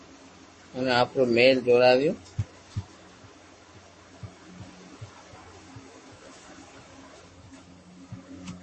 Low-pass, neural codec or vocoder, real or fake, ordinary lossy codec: 10.8 kHz; codec, 44.1 kHz, 7.8 kbps, Pupu-Codec; fake; MP3, 32 kbps